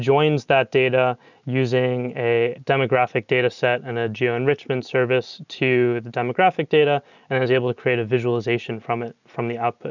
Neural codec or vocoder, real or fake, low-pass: none; real; 7.2 kHz